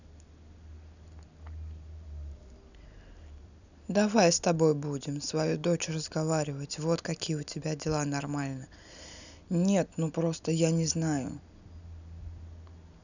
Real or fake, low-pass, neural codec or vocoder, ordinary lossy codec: real; 7.2 kHz; none; none